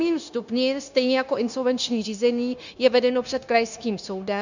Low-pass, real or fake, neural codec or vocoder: 7.2 kHz; fake; codec, 16 kHz, 0.9 kbps, LongCat-Audio-Codec